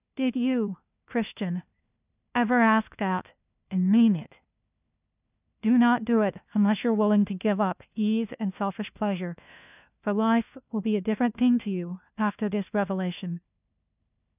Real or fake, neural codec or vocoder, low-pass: fake; codec, 16 kHz, 1 kbps, FunCodec, trained on LibriTTS, 50 frames a second; 3.6 kHz